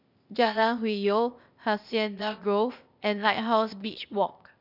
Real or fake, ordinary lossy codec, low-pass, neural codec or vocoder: fake; none; 5.4 kHz; codec, 16 kHz, 0.8 kbps, ZipCodec